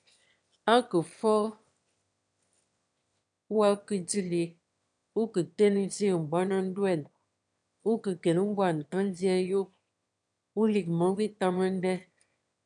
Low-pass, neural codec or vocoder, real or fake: 9.9 kHz; autoencoder, 22.05 kHz, a latent of 192 numbers a frame, VITS, trained on one speaker; fake